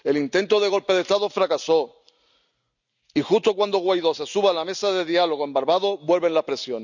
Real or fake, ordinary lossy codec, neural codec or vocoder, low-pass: real; none; none; 7.2 kHz